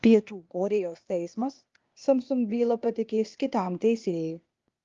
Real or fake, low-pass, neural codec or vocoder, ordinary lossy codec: fake; 7.2 kHz; codec, 16 kHz, 0.8 kbps, ZipCodec; Opus, 32 kbps